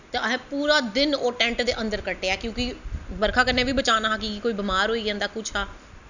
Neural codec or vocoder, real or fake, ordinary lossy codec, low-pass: none; real; none; 7.2 kHz